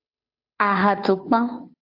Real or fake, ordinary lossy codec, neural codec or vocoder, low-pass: fake; AAC, 48 kbps; codec, 16 kHz, 2 kbps, FunCodec, trained on Chinese and English, 25 frames a second; 5.4 kHz